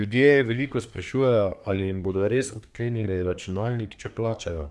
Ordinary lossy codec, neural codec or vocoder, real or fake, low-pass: none; codec, 24 kHz, 1 kbps, SNAC; fake; none